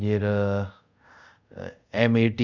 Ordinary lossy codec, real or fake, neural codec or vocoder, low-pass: none; fake; codec, 24 kHz, 0.5 kbps, DualCodec; 7.2 kHz